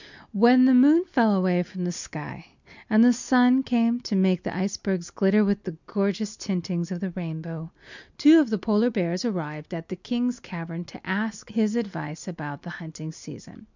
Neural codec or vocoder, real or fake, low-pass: none; real; 7.2 kHz